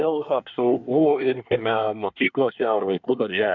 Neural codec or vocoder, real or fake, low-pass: codec, 24 kHz, 1 kbps, SNAC; fake; 7.2 kHz